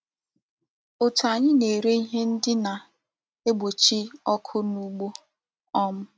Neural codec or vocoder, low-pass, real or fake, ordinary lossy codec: none; none; real; none